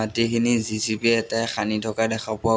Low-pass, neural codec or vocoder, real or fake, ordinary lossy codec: none; none; real; none